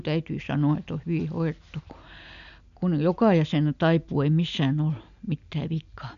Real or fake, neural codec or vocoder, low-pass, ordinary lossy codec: real; none; 7.2 kHz; none